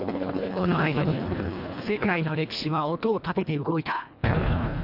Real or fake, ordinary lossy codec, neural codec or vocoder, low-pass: fake; none; codec, 24 kHz, 1.5 kbps, HILCodec; 5.4 kHz